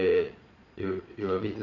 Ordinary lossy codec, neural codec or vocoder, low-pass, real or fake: none; vocoder, 22.05 kHz, 80 mel bands, Vocos; 7.2 kHz; fake